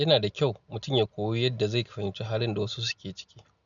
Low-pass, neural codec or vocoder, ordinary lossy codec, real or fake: 7.2 kHz; none; none; real